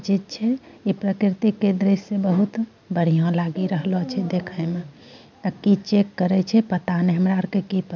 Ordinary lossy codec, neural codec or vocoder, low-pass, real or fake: none; none; 7.2 kHz; real